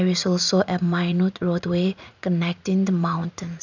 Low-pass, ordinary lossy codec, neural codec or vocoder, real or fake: 7.2 kHz; none; vocoder, 44.1 kHz, 80 mel bands, Vocos; fake